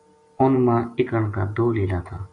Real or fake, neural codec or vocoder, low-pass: real; none; 9.9 kHz